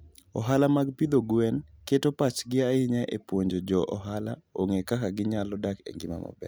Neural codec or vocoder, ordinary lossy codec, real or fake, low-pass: none; none; real; none